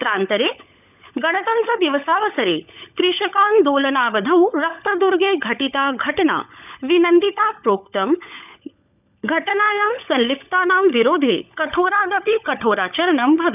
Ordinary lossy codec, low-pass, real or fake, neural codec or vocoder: none; 3.6 kHz; fake; codec, 16 kHz, 16 kbps, FunCodec, trained on LibriTTS, 50 frames a second